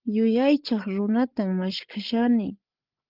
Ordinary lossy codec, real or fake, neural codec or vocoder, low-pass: Opus, 32 kbps; fake; codec, 16 kHz, 8 kbps, FreqCodec, larger model; 5.4 kHz